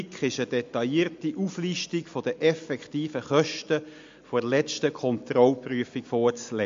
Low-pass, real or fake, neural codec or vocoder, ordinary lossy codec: 7.2 kHz; real; none; MP3, 48 kbps